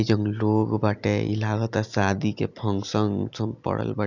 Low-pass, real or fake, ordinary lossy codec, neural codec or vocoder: 7.2 kHz; real; none; none